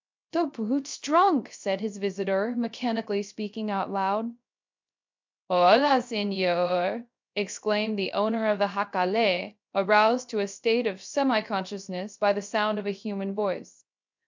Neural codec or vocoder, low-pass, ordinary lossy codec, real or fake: codec, 16 kHz, 0.3 kbps, FocalCodec; 7.2 kHz; MP3, 64 kbps; fake